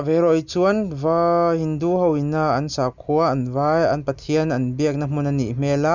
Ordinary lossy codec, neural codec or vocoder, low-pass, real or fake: none; none; 7.2 kHz; real